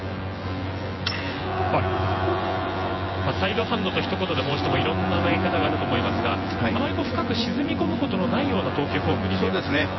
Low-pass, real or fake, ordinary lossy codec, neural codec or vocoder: 7.2 kHz; fake; MP3, 24 kbps; vocoder, 24 kHz, 100 mel bands, Vocos